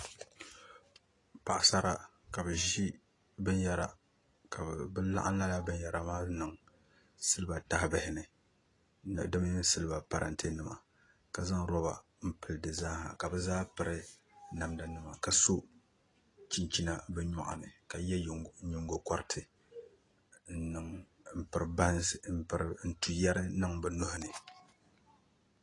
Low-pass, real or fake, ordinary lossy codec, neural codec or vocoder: 10.8 kHz; real; AAC, 32 kbps; none